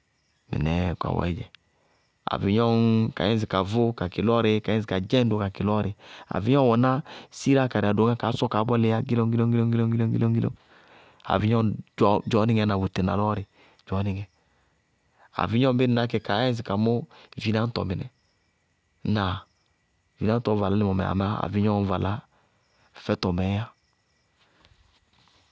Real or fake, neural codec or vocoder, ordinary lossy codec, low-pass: real; none; none; none